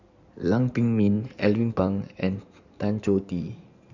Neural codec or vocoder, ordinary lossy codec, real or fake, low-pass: codec, 16 kHz in and 24 kHz out, 2.2 kbps, FireRedTTS-2 codec; none; fake; 7.2 kHz